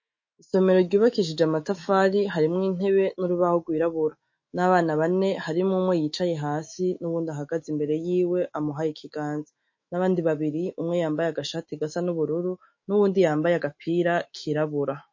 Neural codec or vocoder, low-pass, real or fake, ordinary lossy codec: autoencoder, 48 kHz, 128 numbers a frame, DAC-VAE, trained on Japanese speech; 7.2 kHz; fake; MP3, 32 kbps